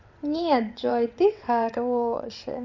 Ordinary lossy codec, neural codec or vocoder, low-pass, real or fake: MP3, 48 kbps; vocoder, 22.05 kHz, 80 mel bands, WaveNeXt; 7.2 kHz; fake